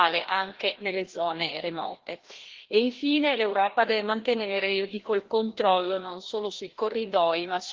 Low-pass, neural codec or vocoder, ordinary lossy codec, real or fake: 7.2 kHz; codec, 16 kHz, 1 kbps, FreqCodec, larger model; Opus, 16 kbps; fake